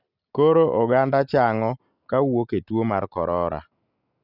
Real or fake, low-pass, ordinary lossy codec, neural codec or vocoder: real; 5.4 kHz; none; none